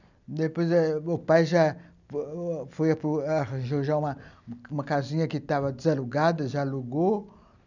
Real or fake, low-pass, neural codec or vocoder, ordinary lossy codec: real; 7.2 kHz; none; none